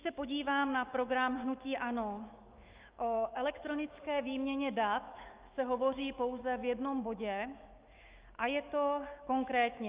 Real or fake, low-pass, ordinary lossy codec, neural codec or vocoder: fake; 3.6 kHz; Opus, 24 kbps; autoencoder, 48 kHz, 128 numbers a frame, DAC-VAE, trained on Japanese speech